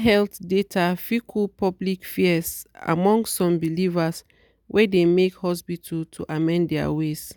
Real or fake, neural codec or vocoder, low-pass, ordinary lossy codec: real; none; none; none